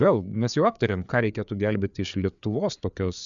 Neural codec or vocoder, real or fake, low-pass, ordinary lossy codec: codec, 16 kHz, 4 kbps, FreqCodec, larger model; fake; 7.2 kHz; MP3, 96 kbps